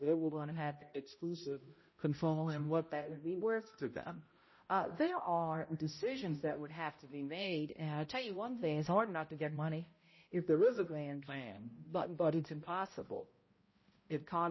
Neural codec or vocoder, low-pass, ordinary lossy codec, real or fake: codec, 16 kHz, 0.5 kbps, X-Codec, HuBERT features, trained on balanced general audio; 7.2 kHz; MP3, 24 kbps; fake